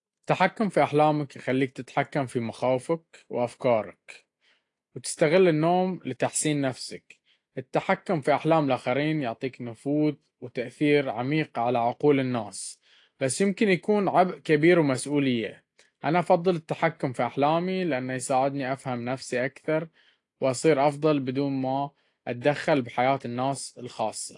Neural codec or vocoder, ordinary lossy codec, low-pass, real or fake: none; AAC, 48 kbps; 10.8 kHz; real